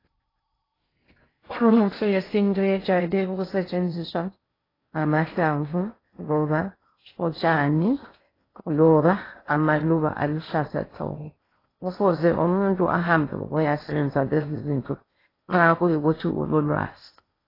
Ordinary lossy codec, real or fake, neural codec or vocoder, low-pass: AAC, 24 kbps; fake; codec, 16 kHz in and 24 kHz out, 0.6 kbps, FocalCodec, streaming, 2048 codes; 5.4 kHz